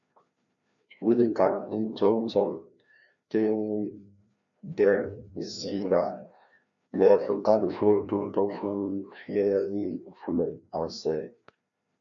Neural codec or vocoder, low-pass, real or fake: codec, 16 kHz, 1 kbps, FreqCodec, larger model; 7.2 kHz; fake